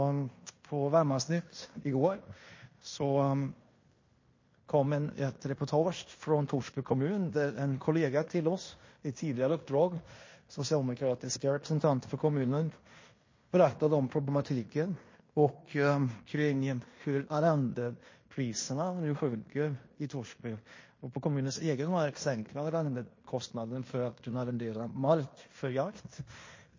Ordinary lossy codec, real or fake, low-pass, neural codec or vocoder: MP3, 32 kbps; fake; 7.2 kHz; codec, 16 kHz in and 24 kHz out, 0.9 kbps, LongCat-Audio-Codec, fine tuned four codebook decoder